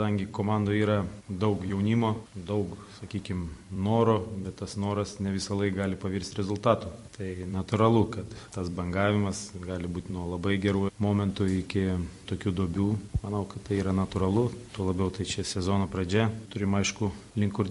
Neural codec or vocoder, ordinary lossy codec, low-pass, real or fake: vocoder, 44.1 kHz, 128 mel bands every 256 samples, BigVGAN v2; MP3, 48 kbps; 14.4 kHz; fake